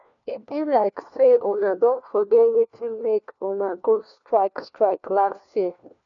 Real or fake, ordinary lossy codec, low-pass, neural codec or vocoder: fake; none; 7.2 kHz; codec, 16 kHz, 1 kbps, FunCodec, trained on LibriTTS, 50 frames a second